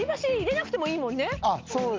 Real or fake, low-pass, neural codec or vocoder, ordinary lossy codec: real; 7.2 kHz; none; Opus, 24 kbps